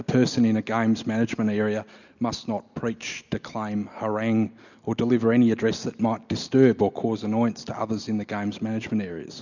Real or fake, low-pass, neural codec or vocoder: real; 7.2 kHz; none